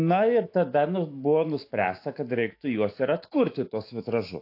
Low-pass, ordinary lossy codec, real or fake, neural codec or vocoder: 5.4 kHz; AAC, 32 kbps; fake; autoencoder, 48 kHz, 128 numbers a frame, DAC-VAE, trained on Japanese speech